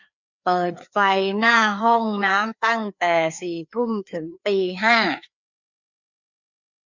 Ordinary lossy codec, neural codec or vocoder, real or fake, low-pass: none; codec, 16 kHz, 2 kbps, FreqCodec, larger model; fake; 7.2 kHz